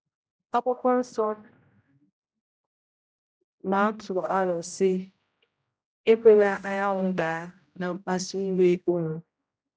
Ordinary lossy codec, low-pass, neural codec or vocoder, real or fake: none; none; codec, 16 kHz, 0.5 kbps, X-Codec, HuBERT features, trained on general audio; fake